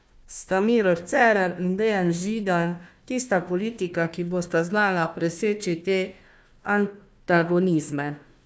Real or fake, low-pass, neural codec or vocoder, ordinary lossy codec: fake; none; codec, 16 kHz, 1 kbps, FunCodec, trained on Chinese and English, 50 frames a second; none